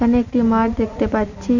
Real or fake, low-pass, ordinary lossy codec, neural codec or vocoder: real; 7.2 kHz; none; none